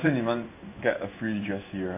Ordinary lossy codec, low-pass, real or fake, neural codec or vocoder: AAC, 24 kbps; 3.6 kHz; fake; codec, 16 kHz in and 24 kHz out, 1 kbps, XY-Tokenizer